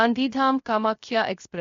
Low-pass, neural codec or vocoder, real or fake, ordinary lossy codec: 7.2 kHz; codec, 16 kHz, 0.3 kbps, FocalCodec; fake; MP3, 48 kbps